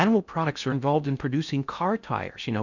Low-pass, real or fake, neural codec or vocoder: 7.2 kHz; fake; codec, 16 kHz in and 24 kHz out, 0.6 kbps, FocalCodec, streaming, 4096 codes